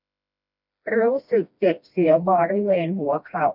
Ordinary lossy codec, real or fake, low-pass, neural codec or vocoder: none; fake; 5.4 kHz; codec, 16 kHz, 1 kbps, FreqCodec, smaller model